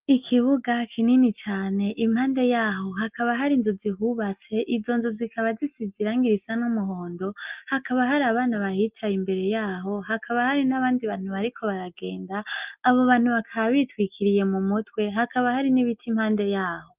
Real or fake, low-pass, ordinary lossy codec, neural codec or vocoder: real; 3.6 kHz; Opus, 24 kbps; none